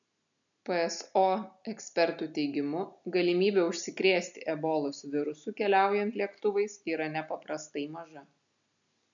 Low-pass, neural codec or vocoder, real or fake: 7.2 kHz; none; real